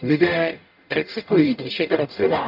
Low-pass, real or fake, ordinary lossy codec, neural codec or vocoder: 5.4 kHz; fake; none; codec, 44.1 kHz, 0.9 kbps, DAC